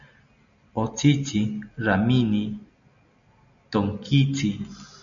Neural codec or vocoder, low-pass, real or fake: none; 7.2 kHz; real